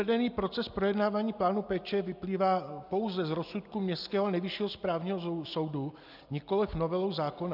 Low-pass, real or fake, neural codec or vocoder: 5.4 kHz; real; none